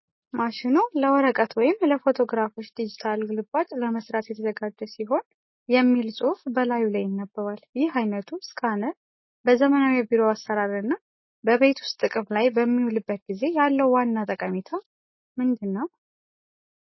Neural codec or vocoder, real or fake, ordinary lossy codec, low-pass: none; real; MP3, 24 kbps; 7.2 kHz